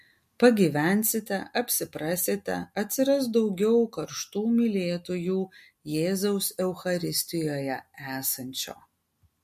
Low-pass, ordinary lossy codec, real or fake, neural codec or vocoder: 14.4 kHz; MP3, 64 kbps; real; none